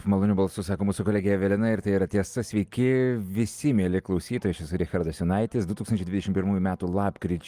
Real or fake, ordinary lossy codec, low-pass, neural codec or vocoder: real; Opus, 32 kbps; 14.4 kHz; none